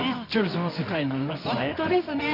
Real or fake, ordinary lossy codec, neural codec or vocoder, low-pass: fake; none; codec, 24 kHz, 0.9 kbps, WavTokenizer, medium music audio release; 5.4 kHz